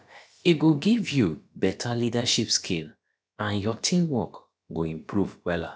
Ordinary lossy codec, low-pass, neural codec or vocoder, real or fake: none; none; codec, 16 kHz, about 1 kbps, DyCAST, with the encoder's durations; fake